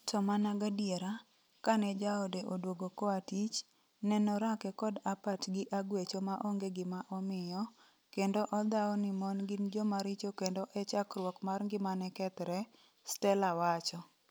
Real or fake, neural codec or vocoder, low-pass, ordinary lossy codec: real; none; none; none